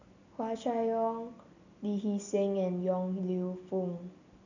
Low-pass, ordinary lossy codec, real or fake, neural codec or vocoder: 7.2 kHz; none; real; none